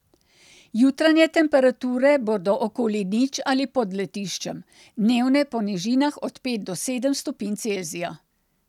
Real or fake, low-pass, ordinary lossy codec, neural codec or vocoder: real; 19.8 kHz; none; none